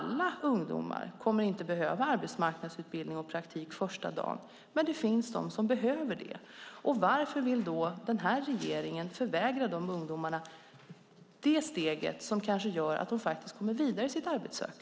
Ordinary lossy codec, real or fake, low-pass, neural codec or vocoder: none; real; none; none